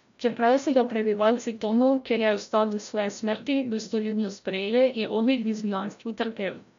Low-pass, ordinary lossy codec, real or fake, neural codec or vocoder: 7.2 kHz; MP3, 64 kbps; fake; codec, 16 kHz, 0.5 kbps, FreqCodec, larger model